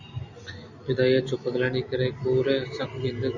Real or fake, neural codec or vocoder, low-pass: real; none; 7.2 kHz